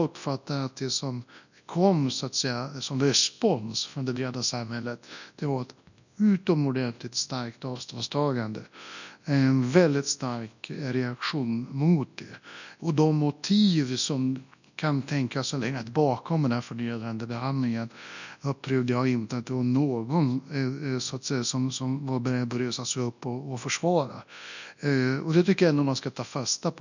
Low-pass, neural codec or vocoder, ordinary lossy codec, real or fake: 7.2 kHz; codec, 24 kHz, 0.9 kbps, WavTokenizer, large speech release; none; fake